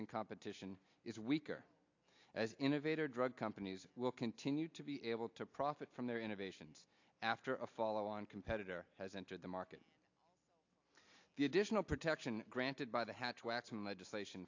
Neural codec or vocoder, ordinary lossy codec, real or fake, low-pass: none; AAC, 48 kbps; real; 7.2 kHz